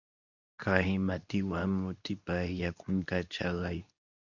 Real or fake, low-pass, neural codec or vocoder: fake; 7.2 kHz; codec, 24 kHz, 0.9 kbps, WavTokenizer, medium speech release version 2